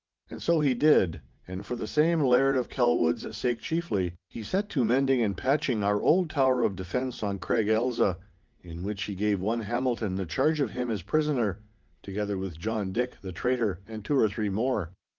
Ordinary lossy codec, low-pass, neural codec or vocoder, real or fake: Opus, 32 kbps; 7.2 kHz; vocoder, 44.1 kHz, 80 mel bands, Vocos; fake